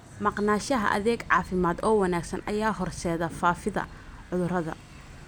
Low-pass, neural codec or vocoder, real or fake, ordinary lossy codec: none; none; real; none